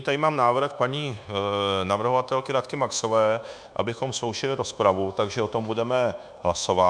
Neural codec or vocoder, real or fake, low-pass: codec, 24 kHz, 1.2 kbps, DualCodec; fake; 9.9 kHz